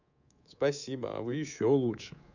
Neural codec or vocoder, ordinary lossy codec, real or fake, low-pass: codec, 24 kHz, 3.1 kbps, DualCodec; none; fake; 7.2 kHz